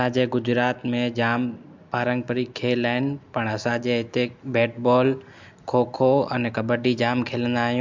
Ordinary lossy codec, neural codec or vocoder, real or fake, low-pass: MP3, 64 kbps; none; real; 7.2 kHz